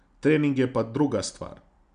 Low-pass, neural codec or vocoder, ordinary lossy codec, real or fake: 9.9 kHz; none; MP3, 96 kbps; real